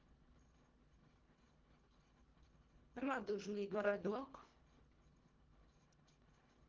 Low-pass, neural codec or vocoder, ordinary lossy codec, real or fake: 7.2 kHz; codec, 24 kHz, 1.5 kbps, HILCodec; Opus, 16 kbps; fake